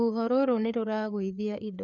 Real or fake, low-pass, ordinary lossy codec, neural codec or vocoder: fake; 7.2 kHz; none; codec, 16 kHz, 4 kbps, FreqCodec, larger model